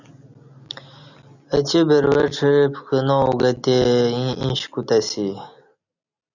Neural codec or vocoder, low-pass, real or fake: none; 7.2 kHz; real